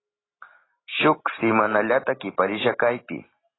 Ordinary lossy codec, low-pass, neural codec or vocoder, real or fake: AAC, 16 kbps; 7.2 kHz; none; real